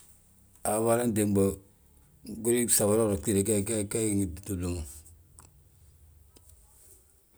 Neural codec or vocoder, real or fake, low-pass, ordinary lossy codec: none; real; none; none